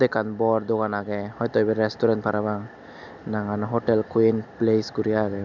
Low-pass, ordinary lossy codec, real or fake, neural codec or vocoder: 7.2 kHz; none; real; none